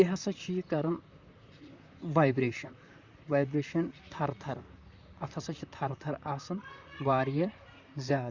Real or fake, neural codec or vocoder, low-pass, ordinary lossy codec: fake; vocoder, 44.1 kHz, 128 mel bands, Pupu-Vocoder; 7.2 kHz; Opus, 64 kbps